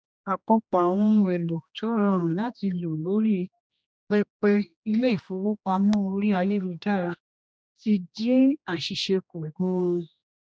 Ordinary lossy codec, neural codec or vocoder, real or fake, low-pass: none; codec, 16 kHz, 1 kbps, X-Codec, HuBERT features, trained on general audio; fake; none